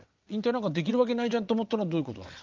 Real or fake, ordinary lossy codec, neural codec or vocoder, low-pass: fake; Opus, 24 kbps; vocoder, 22.05 kHz, 80 mel bands, WaveNeXt; 7.2 kHz